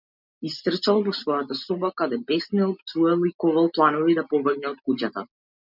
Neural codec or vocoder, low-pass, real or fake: none; 5.4 kHz; real